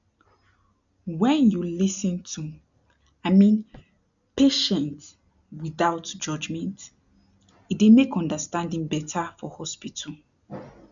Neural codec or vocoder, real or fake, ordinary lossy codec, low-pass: none; real; none; 7.2 kHz